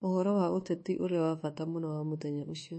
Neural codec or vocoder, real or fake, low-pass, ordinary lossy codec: codec, 24 kHz, 1.2 kbps, DualCodec; fake; 10.8 kHz; MP3, 32 kbps